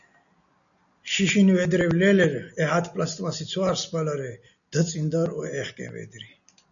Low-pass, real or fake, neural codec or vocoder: 7.2 kHz; real; none